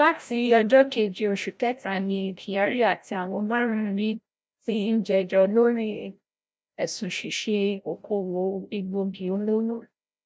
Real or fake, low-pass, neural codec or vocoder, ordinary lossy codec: fake; none; codec, 16 kHz, 0.5 kbps, FreqCodec, larger model; none